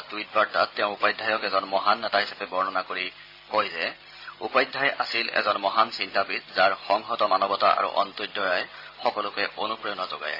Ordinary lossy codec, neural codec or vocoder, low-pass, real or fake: AAC, 32 kbps; none; 5.4 kHz; real